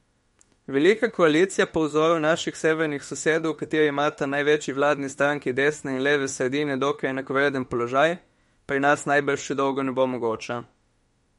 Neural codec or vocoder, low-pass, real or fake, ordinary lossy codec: autoencoder, 48 kHz, 32 numbers a frame, DAC-VAE, trained on Japanese speech; 19.8 kHz; fake; MP3, 48 kbps